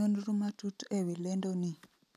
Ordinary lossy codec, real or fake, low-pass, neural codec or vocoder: none; real; 14.4 kHz; none